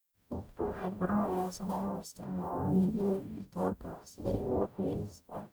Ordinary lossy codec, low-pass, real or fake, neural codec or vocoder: none; none; fake; codec, 44.1 kHz, 0.9 kbps, DAC